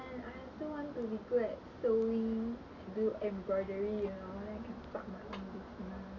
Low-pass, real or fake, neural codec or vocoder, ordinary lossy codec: 7.2 kHz; real; none; none